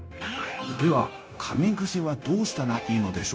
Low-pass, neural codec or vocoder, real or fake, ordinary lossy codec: none; codec, 16 kHz, 0.9 kbps, LongCat-Audio-Codec; fake; none